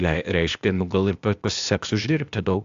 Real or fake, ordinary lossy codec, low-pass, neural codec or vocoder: fake; AAC, 64 kbps; 7.2 kHz; codec, 16 kHz, 0.8 kbps, ZipCodec